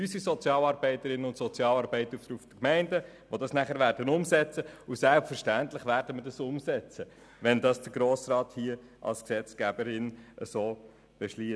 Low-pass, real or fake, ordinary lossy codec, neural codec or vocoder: none; real; none; none